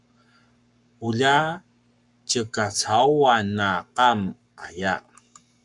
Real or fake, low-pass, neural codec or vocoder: fake; 10.8 kHz; codec, 44.1 kHz, 7.8 kbps, Pupu-Codec